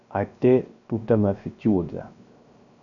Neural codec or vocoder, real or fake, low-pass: codec, 16 kHz, 0.3 kbps, FocalCodec; fake; 7.2 kHz